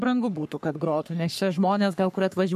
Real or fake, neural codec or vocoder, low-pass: fake; codec, 44.1 kHz, 3.4 kbps, Pupu-Codec; 14.4 kHz